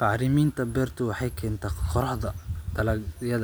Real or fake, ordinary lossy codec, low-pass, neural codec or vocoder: real; none; none; none